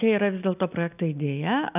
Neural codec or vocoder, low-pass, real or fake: none; 3.6 kHz; real